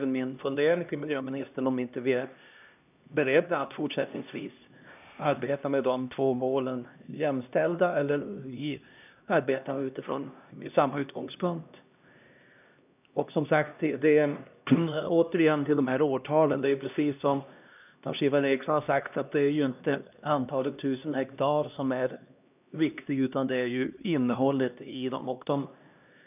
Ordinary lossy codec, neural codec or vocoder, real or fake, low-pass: none; codec, 16 kHz, 1 kbps, X-Codec, HuBERT features, trained on LibriSpeech; fake; 3.6 kHz